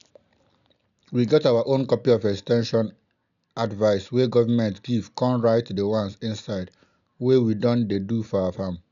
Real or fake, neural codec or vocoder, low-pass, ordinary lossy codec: real; none; 7.2 kHz; none